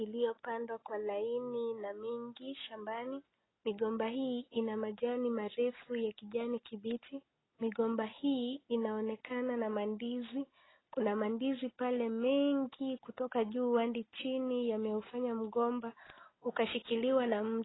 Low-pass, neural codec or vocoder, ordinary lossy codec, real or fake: 7.2 kHz; none; AAC, 16 kbps; real